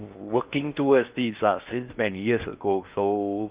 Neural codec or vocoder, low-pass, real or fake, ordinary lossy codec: codec, 16 kHz in and 24 kHz out, 0.6 kbps, FocalCodec, streaming, 4096 codes; 3.6 kHz; fake; Opus, 32 kbps